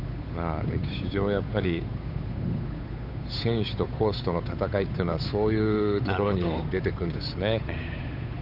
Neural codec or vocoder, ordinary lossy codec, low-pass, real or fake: codec, 16 kHz, 8 kbps, FunCodec, trained on Chinese and English, 25 frames a second; none; 5.4 kHz; fake